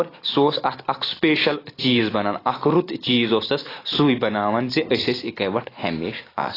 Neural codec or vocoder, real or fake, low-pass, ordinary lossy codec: none; real; 5.4 kHz; AAC, 24 kbps